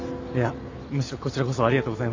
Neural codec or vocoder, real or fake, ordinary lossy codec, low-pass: none; real; AAC, 48 kbps; 7.2 kHz